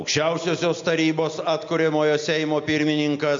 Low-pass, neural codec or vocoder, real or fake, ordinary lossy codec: 7.2 kHz; none; real; MP3, 48 kbps